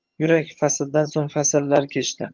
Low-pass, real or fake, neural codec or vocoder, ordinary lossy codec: 7.2 kHz; fake; vocoder, 22.05 kHz, 80 mel bands, HiFi-GAN; Opus, 24 kbps